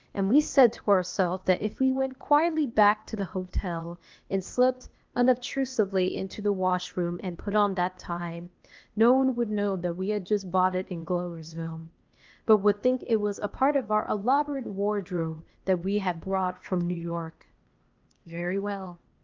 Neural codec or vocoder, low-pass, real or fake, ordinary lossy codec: codec, 16 kHz, 0.8 kbps, ZipCodec; 7.2 kHz; fake; Opus, 24 kbps